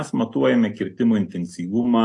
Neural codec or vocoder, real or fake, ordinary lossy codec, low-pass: none; real; AAC, 48 kbps; 10.8 kHz